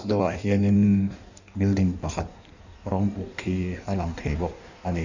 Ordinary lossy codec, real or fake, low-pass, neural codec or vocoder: none; fake; 7.2 kHz; codec, 16 kHz in and 24 kHz out, 1.1 kbps, FireRedTTS-2 codec